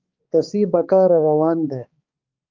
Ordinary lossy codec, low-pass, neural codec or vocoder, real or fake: Opus, 24 kbps; 7.2 kHz; codec, 16 kHz, 2 kbps, X-Codec, HuBERT features, trained on balanced general audio; fake